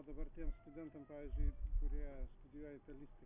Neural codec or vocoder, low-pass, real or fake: none; 3.6 kHz; real